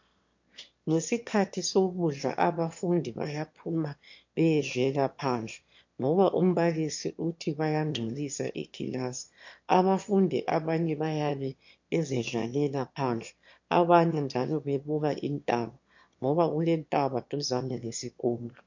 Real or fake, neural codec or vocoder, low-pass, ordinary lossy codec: fake; autoencoder, 22.05 kHz, a latent of 192 numbers a frame, VITS, trained on one speaker; 7.2 kHz; MP3, 48 kbps